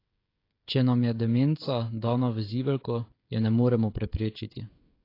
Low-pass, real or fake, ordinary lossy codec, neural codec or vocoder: 5.4 kHz; fake; AAC, 32 kbps; codec, 16 kHz, 16 kbps, FreqCodec, smaller model